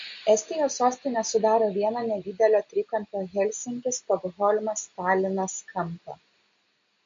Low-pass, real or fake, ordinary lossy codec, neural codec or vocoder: 7.2 kHz; real; MP3, 48 kbps; none